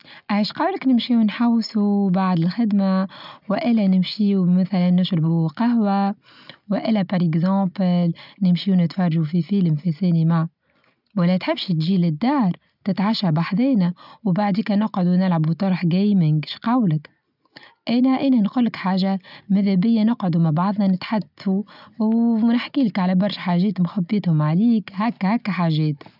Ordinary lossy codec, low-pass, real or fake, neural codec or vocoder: none; 5.4 kHz; real; none